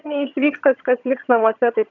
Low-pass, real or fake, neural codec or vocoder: 7.2 kHz; fake; vocoder, 22.05 kHz, 80 mel bands, HiFi-GAN